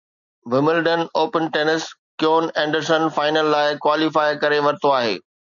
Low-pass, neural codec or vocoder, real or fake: 7.2 kHz; none; real